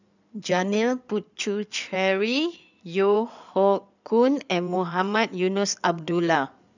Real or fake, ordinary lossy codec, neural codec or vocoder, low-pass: fake; none; codec, 16 kHz in and 24 kHz out, 2.2 kbps, FireRedTTS-2 codec; 7.2 kHz